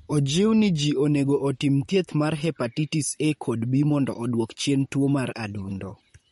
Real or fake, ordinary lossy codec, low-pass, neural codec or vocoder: fake; MP3, 48 kbps; 19.8 kHz; vocoder, 44.1 kHz, 128 mel bands, Pupu-Vocoder